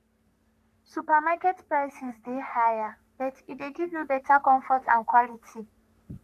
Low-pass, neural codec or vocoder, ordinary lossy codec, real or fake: 14.4 kHz; codec, 44.1 kHz, 3.4 kbps, Pupu-Codec; none; fake